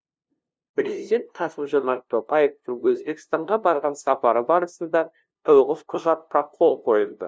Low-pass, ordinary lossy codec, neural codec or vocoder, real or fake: none; none; codec, 16 kHz, 0.5 kbps, FunCodec, trained on LibriTTS, 25 frames a second; fake